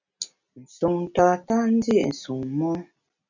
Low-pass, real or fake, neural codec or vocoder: 7.2 kHz; real; none